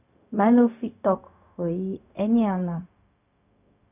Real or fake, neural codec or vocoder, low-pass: fake; codec, 16 kHz, 0.4 kbps, LongCat-Audio-Codec; 3.6 kHz